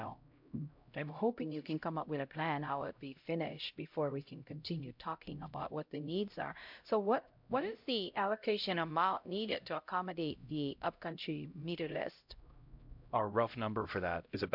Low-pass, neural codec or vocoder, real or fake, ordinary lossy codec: 5.4 kHz; codec, 16 kHz, 0.5 kbps, X-Codec, HuBERT features, trained on LibriSpeech; fake; MP3, 48 kbps